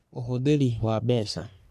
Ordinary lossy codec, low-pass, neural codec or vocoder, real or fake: none; 14.4 kHz; codec, 44.1 kHz, 3.4 kbps, Pupu-Codec; fake